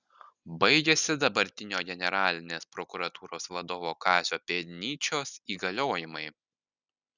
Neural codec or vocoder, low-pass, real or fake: none; 7.2 kHz; real